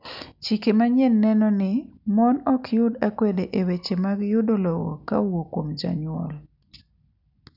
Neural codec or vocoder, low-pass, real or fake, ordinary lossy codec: none; 5.4 kHz; real; none